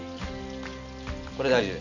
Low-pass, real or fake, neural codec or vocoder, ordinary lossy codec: 7.2 kHz; real; none; none